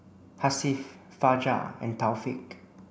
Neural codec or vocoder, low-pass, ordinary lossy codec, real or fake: none; none; none; real